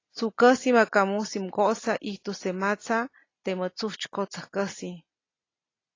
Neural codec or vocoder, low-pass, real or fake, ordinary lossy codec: none; 7.2 kHz; real; AAC, 32 kbps